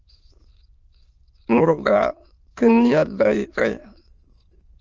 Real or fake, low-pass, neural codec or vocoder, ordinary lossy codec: fake; 7.2 kHz; autoencoder, 22.05 kHz, a latent of 192 numbers a frame, VITS, trained on many speakers; Opus, 24 kbps